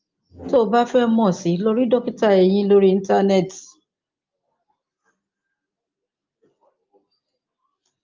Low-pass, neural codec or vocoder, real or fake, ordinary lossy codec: 7.2 kHz; none; real; Opus, 24 kbps